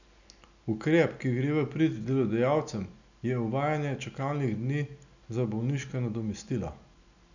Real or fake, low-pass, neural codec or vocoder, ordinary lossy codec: real; 7.2 kHz; none; none